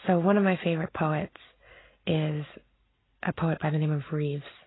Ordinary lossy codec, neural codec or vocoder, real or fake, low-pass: AAC, 16 kbps; none; real; 7.2 kHz